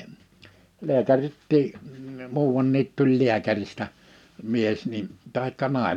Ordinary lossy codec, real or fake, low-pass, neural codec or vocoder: none; real; 19.8 kHz; none